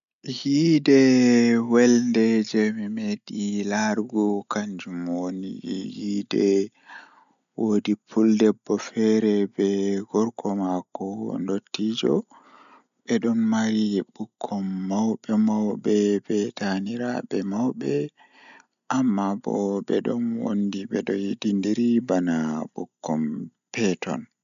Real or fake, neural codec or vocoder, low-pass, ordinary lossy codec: real; none; 7.2 kHz; none